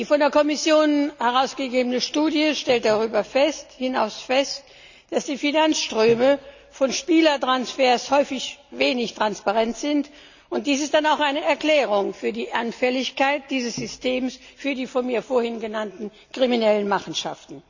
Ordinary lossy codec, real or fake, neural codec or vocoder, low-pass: none; real; none; 7.2 kHz